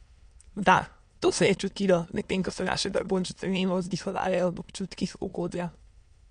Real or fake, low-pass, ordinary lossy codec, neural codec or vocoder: fake; 9.9 kHz; MP3, 64 kbps; autoencoder, 22.05 kHz, a latent of 192 numbers a frame, VITS, trained on many speakers